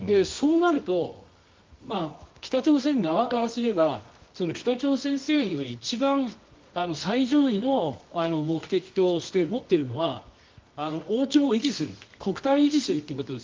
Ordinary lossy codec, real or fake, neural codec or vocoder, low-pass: Opus, 32 kbps; fake; codec, 24 kHz, 0.9 kbps, WavTokenizer, medium music audio release; 7.2 kHz